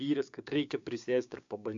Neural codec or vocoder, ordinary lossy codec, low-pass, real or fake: codec, 16 kHz, 6 kbps, DAC; AAC, 64 kbps; 7.2 kHz; fake